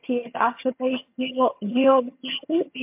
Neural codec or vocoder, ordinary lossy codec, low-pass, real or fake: vocoder, 22.05 kHz, 80 mel bands, HiFi-GAN; MP3, 32 kbps; 3.6 kHz; fake